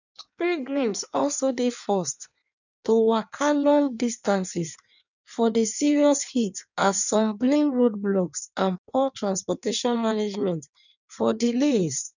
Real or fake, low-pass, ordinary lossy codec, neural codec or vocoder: fake; 7.2 kHz; none; codec, 16 kHz in and 24 kHz out, 1.1 kbps, FireRedTTS-2 codec